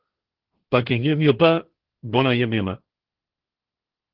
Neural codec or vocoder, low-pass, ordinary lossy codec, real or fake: codec, 16 kHz, 1.1 kbps, Voila-Tokenizer; 5.4 kHz; Opus, 16 kbps; fake